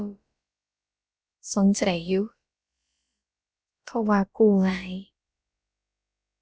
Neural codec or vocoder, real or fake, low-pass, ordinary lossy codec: codec, 16 kHz, about 1 kbps, DyCAST, with the encoder's durations; fake; none; none